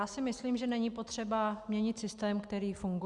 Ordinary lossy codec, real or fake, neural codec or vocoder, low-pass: Opus, 64 kbps; real; none; 10.8 kHz